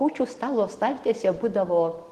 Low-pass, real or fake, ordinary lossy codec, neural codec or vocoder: 14.4 kHz; real; Opus, 16 kbps; none